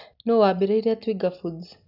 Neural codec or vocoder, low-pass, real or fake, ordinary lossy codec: none; 5.4 kHz; real; none